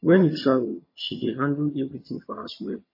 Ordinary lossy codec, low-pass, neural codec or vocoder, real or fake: MP3, 24 kbps; 5.4 kHz; vocoder, 22.05 kHz, 80 mel bands, HiFi-GAN; fake